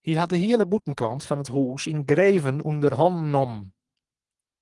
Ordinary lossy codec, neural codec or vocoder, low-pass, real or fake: Opus, 24 kbps; codec, 44.1 kHz, 2.6 kbps, SNAC; 10.8 kHz; fake